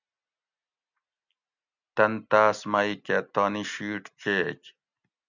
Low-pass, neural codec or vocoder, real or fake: 7.2 kHz; none; real